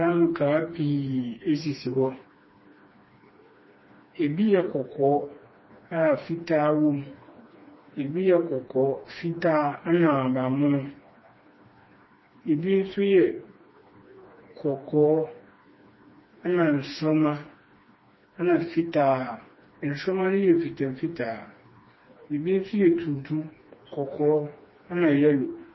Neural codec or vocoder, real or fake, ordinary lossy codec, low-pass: codec, 16 kHz, 2 kbps, FreqCodec, smaller model; fake; MP3, 24 kbps; 7.2 kHz